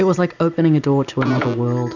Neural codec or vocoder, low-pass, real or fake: none; 7.2 kHz; real